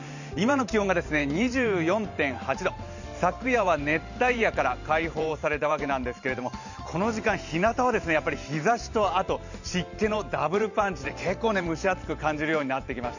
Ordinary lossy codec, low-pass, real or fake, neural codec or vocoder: none; 7.2 kHz; fake; vocoder, 44.1 kHz, 128 mel bands every 512 samples, BigVGAN v2